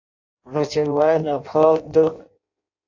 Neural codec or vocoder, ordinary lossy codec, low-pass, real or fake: codec, 16 kHz in and 24 kHz out, 0.6 kbps, FireRedTTS-2 codec; AAC, 48 kbps; 7.2 kHz; fake